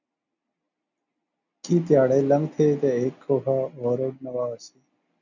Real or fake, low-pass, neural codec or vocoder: real; 7.2 kHz; none